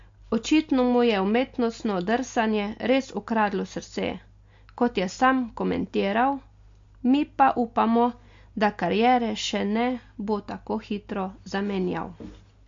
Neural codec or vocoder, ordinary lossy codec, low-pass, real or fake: none; MP3, 48 kbps; 7.2 kHz; real